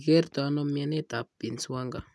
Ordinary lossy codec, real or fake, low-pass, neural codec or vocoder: none; real; none; none